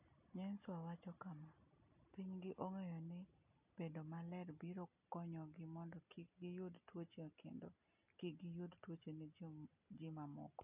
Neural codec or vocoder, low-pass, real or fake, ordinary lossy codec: none; 3.6 kHz; real; none